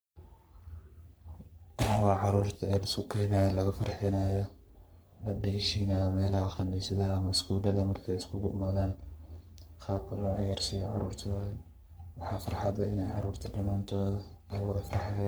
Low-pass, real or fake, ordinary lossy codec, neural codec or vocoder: none; fake; none; codec, 44.1 kHz, 3.4 kbps, Pupu-Codec